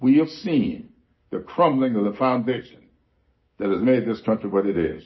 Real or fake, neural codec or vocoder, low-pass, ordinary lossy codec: real; none; 7.2 kHz; MP3, 24 kbps